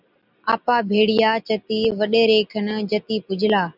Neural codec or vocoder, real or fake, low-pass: none; real; 5.4 kHz